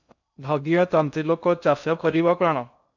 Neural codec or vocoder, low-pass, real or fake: codec, 16 kHz in and 24 kHz out, 0.6 kbps, FocalCodec, streaming, 2048 codes; 7.2 kHz; fake